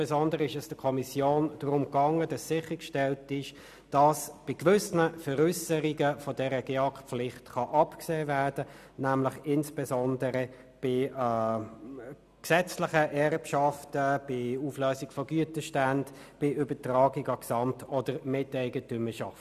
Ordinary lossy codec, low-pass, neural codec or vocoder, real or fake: none; 14.4 kHz; none; real